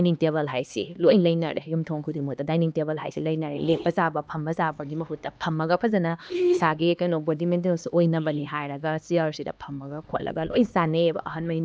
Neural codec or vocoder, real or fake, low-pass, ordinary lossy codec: codec, 16 kHz, 2 kbps, X-Codec, HuBERT features, trained on LibriSpeech; fake; none; none